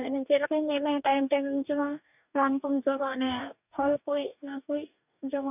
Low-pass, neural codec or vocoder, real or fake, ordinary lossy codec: 3.6 kHz; codec, 44.1 kHz, 2.6 kbps, DAC; fake; none